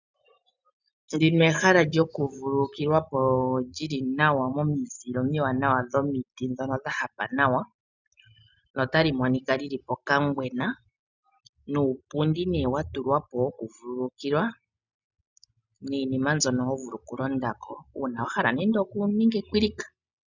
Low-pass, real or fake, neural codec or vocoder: 7.2 kHz; real; none